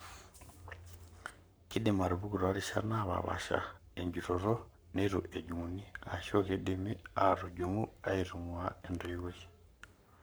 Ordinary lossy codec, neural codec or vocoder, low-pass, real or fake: none; codec, 44.1 kHz, 7.8 kbps, Pupu-Codec; none; fake